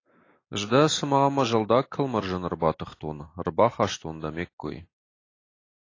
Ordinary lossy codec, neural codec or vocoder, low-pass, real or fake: AAC, 32 kbps; none; 7.2 kHz; real